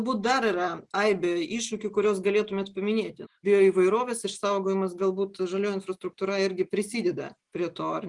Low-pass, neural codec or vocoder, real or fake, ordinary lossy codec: 10.8 kHz; none; real; Opus, 32 kbps